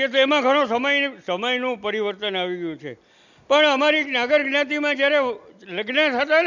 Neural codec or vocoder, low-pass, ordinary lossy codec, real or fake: none; 7.2 kHz; none; real